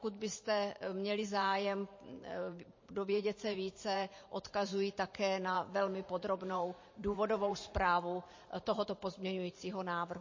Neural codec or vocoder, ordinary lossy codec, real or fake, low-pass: vocoder, 44.1 kHz, 128 mel bands every 512 samples, BigVGAN v2; MP3, 32 kbps; fake; 7.2 kHz